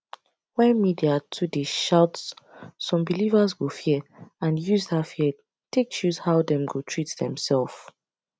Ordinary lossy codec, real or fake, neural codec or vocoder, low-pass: none; real; none; none